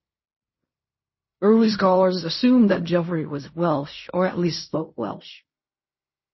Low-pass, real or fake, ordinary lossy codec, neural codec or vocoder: 7.2 kHz; fake; MP3, 24 kbps; codec, 16 kHz in and 24 kHz out, 0.4 kbps, LongCat-Audio-Codec, fine tuned four codebook decoder